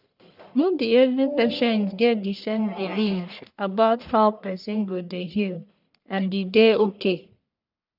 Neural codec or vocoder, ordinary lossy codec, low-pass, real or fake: codec, 44.1 kHz, 1.7 kbps, Pupu-Codec; none; 5.4 kHz; fake